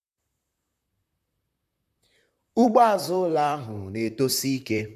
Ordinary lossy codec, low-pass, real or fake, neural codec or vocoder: none; 14.4 kHz; fake; vocoder, 44.1 kHz, 128 mel bands, Pupu-Vocoder